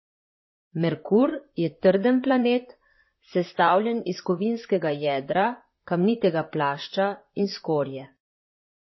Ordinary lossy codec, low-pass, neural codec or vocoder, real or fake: MP3, 24 kbps; 7.2 kHz; vocoder, 44.1 kHz, 128 mel bands, Pupu-Vocoder; fake